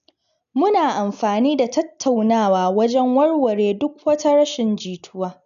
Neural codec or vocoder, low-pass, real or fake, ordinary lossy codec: none; 7.2 kHz; real; none